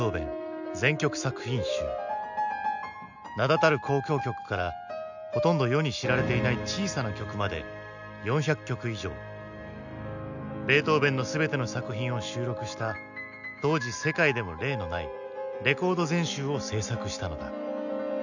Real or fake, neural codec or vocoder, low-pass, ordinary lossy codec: real; none; 7.2 kHz; none